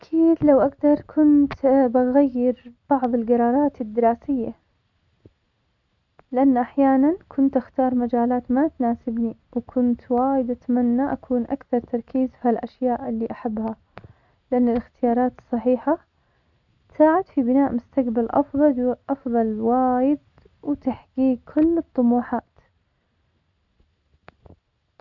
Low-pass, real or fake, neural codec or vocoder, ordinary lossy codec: 7.2 kHz; real; none; none